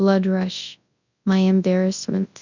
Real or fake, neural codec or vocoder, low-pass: fake; codec, 24 kHz, 0.9 kbps, WavTokenizer, large speech release; 7.2 kHz